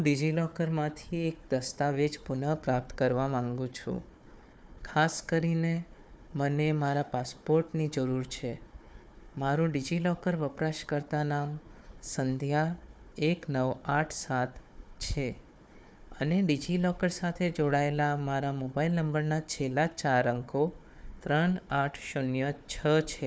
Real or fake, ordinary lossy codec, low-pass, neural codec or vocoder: fake; none; none; codec, 16 kHz, 4 kbps, FunCodec, trained on Chinese and English, 50 frames a second